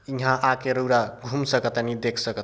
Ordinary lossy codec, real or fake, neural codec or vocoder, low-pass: none; real; none; none